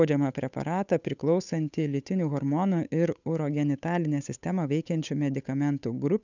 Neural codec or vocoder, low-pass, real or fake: codec, 16 kHz, 8 kbps, FunCodec, trained on Chinese and English, 25 frames a second; 7.2 kHz; fake